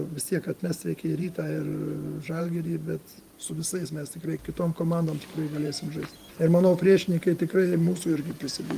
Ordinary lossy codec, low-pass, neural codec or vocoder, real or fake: Opus, 32 kbps; 14.4 kHz; vocoder, 44.1 kHz, 128 mel bands every 512 samples, BigVGAN v2; fake